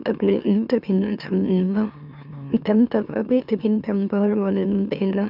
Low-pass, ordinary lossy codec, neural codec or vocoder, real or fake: 5.4 kHz; none; autoencoder, 44.1 kHz, a latent of 192 numbers a frame, MeloTTS; fake